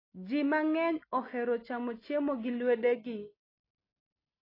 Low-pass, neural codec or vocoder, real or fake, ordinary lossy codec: 5.4 kHz; none; real; AAC, 24 kbps